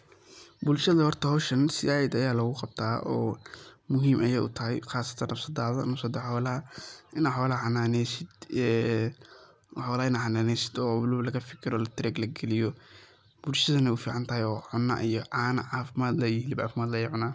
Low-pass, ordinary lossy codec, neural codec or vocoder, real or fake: none; none; none; real